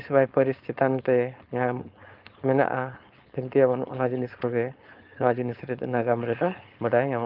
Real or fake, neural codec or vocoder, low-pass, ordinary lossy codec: fake; codec, 16 kHz, 4.8 kbps, FACodec; 5.4 kHz; Opus, 24 kbps